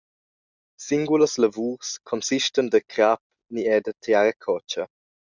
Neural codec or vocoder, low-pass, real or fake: none; 7.2 kHz; real